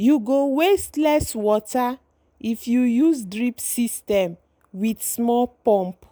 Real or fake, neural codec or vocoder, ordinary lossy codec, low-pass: real; none; none; none